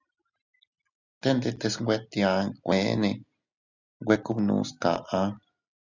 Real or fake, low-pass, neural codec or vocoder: real; 7.2 kHz; none